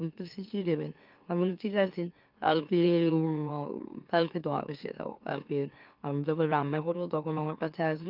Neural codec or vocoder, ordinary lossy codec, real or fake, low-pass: autoencoder, 44.1 kHz, a latent of 192 numbers a frame, MeloTTS; Opus, 24 kbps; fake; 5.4 kHz